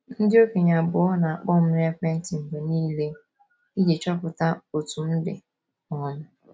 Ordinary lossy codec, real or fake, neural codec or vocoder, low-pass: none; real; none; none